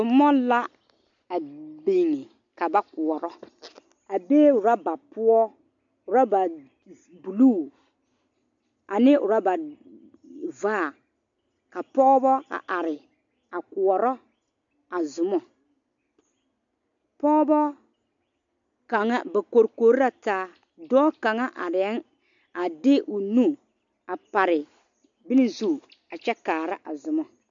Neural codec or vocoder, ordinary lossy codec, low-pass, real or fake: none; MP3, 64 kbps; 7.2 kHz; real